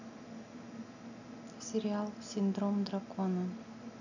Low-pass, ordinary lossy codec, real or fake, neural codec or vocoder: 7.2 kHz; none; real; none